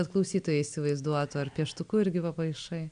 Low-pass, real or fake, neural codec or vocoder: 9.9 kHz; real; none